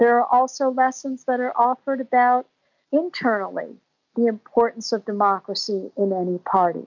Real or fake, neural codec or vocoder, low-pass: real; none; 7.2 kHz